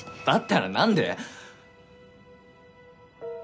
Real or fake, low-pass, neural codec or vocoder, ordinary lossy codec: real; none; none; none